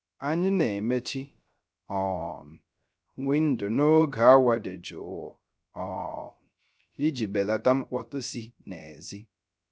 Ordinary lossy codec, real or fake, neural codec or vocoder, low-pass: none; fake; codec, 16 kHz, 0.3 kbps, FocalCodec; none